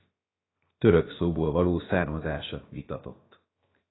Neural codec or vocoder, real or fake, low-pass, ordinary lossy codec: codec, 16 kHz, 0.7 kbps, FocalCodec; fake; 7.2 kHz; AAC, 16 kbps